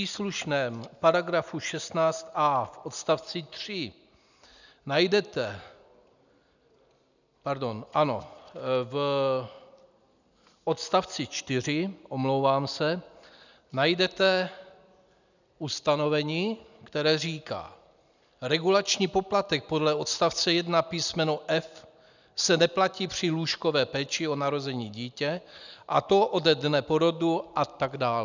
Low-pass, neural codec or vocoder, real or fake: 7.2 kHz; none; real